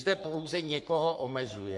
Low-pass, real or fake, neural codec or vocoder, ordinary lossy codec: 10.8 kHz; fake; codec, 44.1 kHz, 3.4 kbps, Pupu-Codec; MP3, 64 kbps